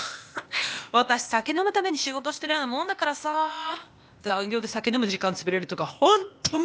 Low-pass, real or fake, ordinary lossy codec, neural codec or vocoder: none; fake; none; codec, 16 kHz, 0.8 kbps, ZipCodec